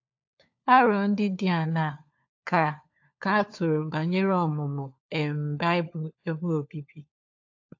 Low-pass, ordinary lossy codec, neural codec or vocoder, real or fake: 7.2 kHz; AAC, 48 kbps; codec, 16 kHz, 4 kbps, FunCodec, trained on LibriTTS, 50 frames a second; fake